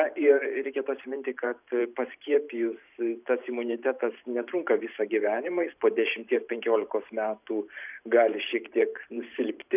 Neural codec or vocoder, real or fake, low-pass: vocoder, 44.1 kHz, 128 mel bands every 512 samples, BigVGAN v2; fake; 3.6 kHz